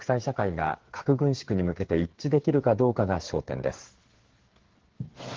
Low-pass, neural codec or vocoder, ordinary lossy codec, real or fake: 7.2 kHz; codec, 16 kHz, 8 kbps, FreqCodec, smaller model; Opus, 16 kbps; fake